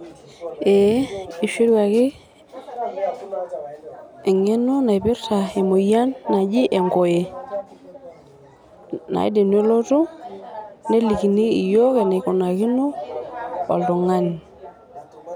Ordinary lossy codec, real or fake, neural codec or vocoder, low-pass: none; real; none; 19.8 kHz